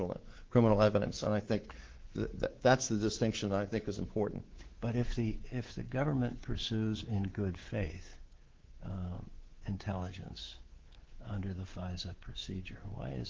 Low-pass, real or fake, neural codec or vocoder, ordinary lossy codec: 7.2 kHz; fake; codec, 24 kHz, 3.1 kbps, DualCodec; Opus, 16 kbps